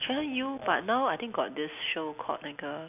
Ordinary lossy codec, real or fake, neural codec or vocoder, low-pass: none; real; none; 3.6 kHz